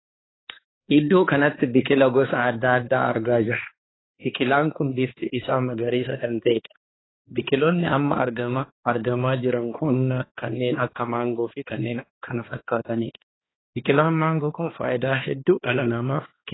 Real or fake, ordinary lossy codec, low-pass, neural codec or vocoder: fake; AAC, 16 kbps; 7.2 kHz; codec, 16 kHz, 2 kbps, X-Codec, HuBERT features, trained on balanced general audio